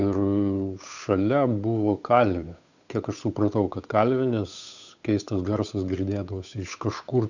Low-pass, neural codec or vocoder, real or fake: 7.2 kHz; codec, 16 kHz, 8 kbps, FunCodec, trained on Chinese and English, 25 frames a second; fake